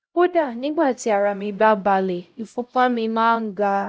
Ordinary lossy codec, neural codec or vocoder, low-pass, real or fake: none; codec, 16 kHz, 0.5 kbps, X-Codec, HuBERT features, trained on LibriSpeech; none; fake